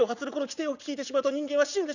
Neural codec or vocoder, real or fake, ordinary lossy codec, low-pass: vocoder, 44.1 kHz, 80 mel bands, Vocos; fake; none; 7.2 kHz